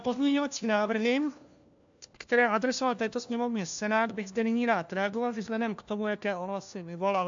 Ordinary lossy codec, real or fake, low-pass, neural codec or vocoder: AAC, 64 kbps; fake; 7.2 kHz; codec, 16 kHz, 1 kbps, FunCodec, trained on LibriTTS, 50 frames a second